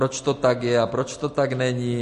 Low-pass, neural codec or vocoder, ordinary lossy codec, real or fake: 14.4 kHz; none; MP3, 48 kbps; real